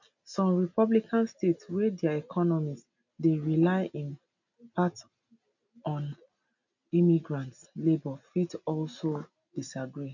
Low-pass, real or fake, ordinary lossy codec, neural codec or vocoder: 7.2 kHz; real; none; none